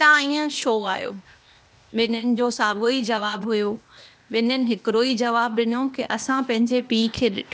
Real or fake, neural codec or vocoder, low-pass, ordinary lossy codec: fake; codec, 16 kHz, 0.8 kbps, ZipCodec; none; none